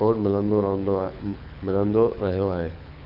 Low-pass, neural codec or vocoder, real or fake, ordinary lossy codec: 5.4 kHz; codec, 24 kHz, 6 kbps, HILCodec; fake; none